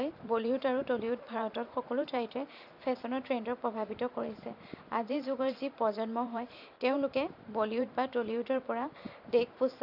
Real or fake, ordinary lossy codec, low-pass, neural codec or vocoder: fake; none; 5.4 kHz; vocoder, 44.1 kHz, 128 mel bands every 512 samples, BigVGAN v2